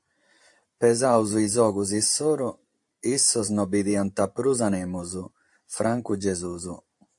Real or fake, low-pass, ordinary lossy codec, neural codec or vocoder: fake; 10.8 kHz; AAC, 64 kbps; vocoder, 44.1 kHz, 128 mel bands every 512 samples, BigVGAN v2